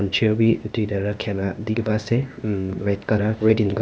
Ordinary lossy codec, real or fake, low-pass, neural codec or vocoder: none; fake; none; codec, 16 kHz, 0.8 kbps, ZipCodec